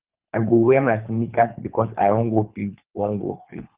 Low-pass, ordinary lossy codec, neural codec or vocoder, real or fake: 3.6 kHz; Opus, 24 kbps; codec, 24 kHz, 3 kbps, HILCodec; fake